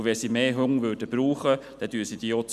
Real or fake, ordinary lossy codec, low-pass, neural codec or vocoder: real; none; 14.4 kHz; none